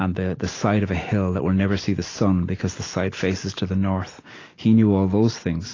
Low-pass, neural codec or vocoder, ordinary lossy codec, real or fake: 7.2 kHz; none; AAC, 32 kbps; real